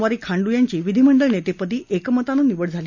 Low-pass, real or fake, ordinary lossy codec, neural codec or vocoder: 7.2 kHz; real; none; none